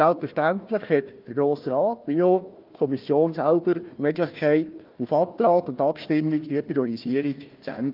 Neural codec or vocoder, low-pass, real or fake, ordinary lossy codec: codec, 16 kHz, 1 kbps, FunCodec, trained on Chinese and English, 50 frames a second; 5.4 kHz; fake; Opus, 24 kbps